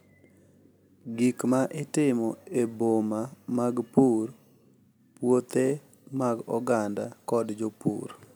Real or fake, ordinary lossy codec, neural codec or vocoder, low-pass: real; none; none; none